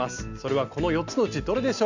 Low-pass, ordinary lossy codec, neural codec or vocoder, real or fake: 7.2 kHz; none; none; real